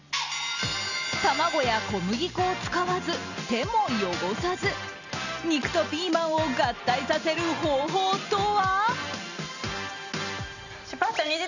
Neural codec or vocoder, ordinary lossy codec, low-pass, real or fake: none; none; 7.2 kHz; real